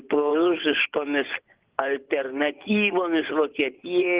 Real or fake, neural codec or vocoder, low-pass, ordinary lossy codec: fake; vocoder, 24 kHz, 100 mel bands, Vocos; 3.6 kHz; Opus, 16 kbps